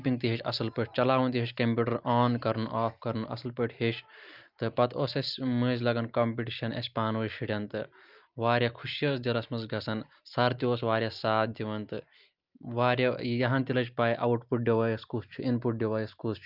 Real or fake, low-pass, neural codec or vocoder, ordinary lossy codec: real; 5.4 kHz; none; Opus, 24 kbps